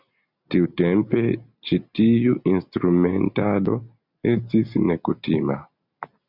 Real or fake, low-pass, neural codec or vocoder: real; 5.4 kHz; none